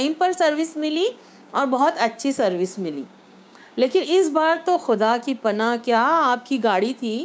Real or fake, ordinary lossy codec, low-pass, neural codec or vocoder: fake; none; none; codec, 16 kHz, 6 kbps, DAC